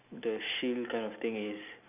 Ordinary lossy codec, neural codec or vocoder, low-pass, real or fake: none; none; 3.6 kHz; real